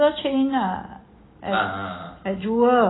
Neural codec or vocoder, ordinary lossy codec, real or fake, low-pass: none; AAC, 16 kbps; real; 7.2 kHz